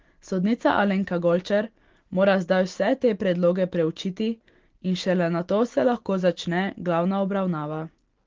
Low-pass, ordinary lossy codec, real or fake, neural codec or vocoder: 7.2 kHz; Opus, 16 kbps; real; none